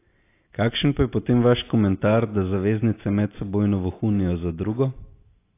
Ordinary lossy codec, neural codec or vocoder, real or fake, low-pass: AAC, 24 kbps; none; real; 3.6 kHz